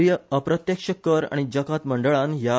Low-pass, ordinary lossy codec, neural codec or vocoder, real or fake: none; none; none; real